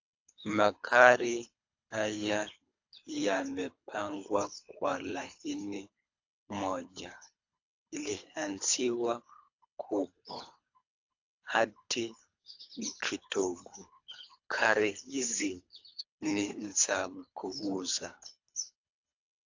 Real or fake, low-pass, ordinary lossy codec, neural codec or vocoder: fake; 7.2 kHz; AAC, 48 kbps; codec, 24 kHz, 3 kbps, HILCodec